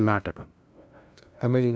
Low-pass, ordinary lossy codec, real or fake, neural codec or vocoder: none; none; fake; codec, 16 kHz, 0.5 kbps, FunCodec, trained on LibriTTS, 25 frames a second